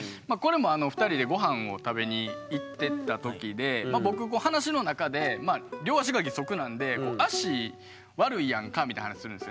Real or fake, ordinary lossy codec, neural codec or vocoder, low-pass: real; none; none; none